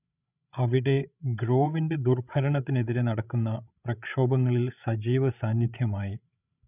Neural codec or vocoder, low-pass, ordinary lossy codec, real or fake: codec, 16 kHz, 16 kbps, FreqCodec, larger model; 3.6 kHz; none; fake